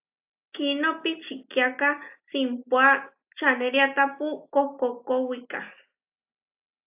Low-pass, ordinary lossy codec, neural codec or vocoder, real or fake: 3.6 kHz; AAC, 32 kbps; none; real